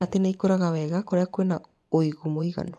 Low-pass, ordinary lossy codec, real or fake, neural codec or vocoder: none; none; fake; vocoder, 24 kHz, 100 mel bands, Vocos